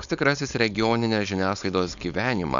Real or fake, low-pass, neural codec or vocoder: fake; 7.2 kHz; codec, 16 kHz, 4.8 kbps, FACodec